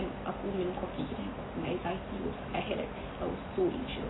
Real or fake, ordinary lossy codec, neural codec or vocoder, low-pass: real; AAC, 16 kbps; none; 7.2 kHz